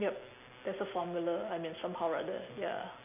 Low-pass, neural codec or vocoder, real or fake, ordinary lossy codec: 3.6 kHz; none; real; none